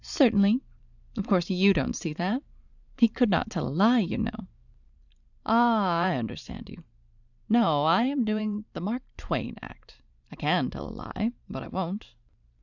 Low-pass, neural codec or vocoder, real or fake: 7.2 kHz; vocoder, 44.1 kHz, 128 mel bands every 512 samples, BigVGAN v2; fake